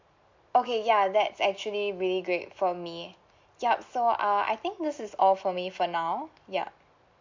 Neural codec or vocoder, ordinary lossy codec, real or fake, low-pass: none; MP3, 48 kbps; real; 7.2 kHz